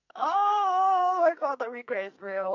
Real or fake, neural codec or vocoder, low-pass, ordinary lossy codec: fake; codec, 44.1 kHz, 2.6 kbps, SNAC; 7.2 kHz; none